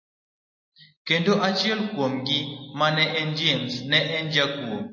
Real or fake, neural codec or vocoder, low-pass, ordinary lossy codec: real; none; 7.2 kHz; MP3, 32 kbps